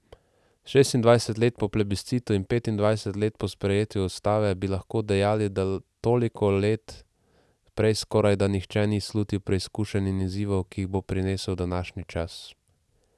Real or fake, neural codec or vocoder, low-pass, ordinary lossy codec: real; none; none; none